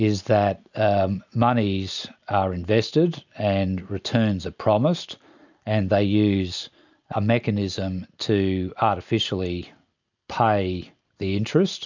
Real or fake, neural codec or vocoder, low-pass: real; none; 7.2 kHz